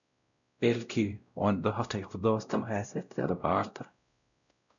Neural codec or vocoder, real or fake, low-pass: codec, 16 kHz, 0.5 kbps, X-Codec, WavLM features, trained on Multilingual LibriSpeech; fake; 7.2 kHz